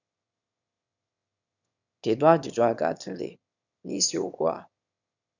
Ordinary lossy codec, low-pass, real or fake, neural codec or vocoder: none; 7.2 kHz; fake; autoencoder, 22.05 kHz, a latent of 192 numbers a frame, VITS, trained on one speaker